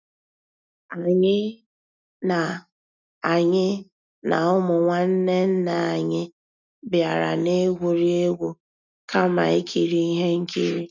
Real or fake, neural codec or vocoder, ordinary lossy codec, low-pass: real; none; none; 7.2 kHz